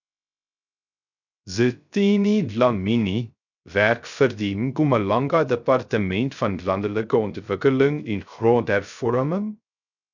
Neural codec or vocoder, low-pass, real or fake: codec, 16 kHz, 0.3 kbps, FocalCodec; 7.2 kHz; fake